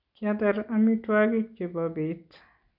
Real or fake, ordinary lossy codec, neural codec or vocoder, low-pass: real; none; none; 5.4 kHz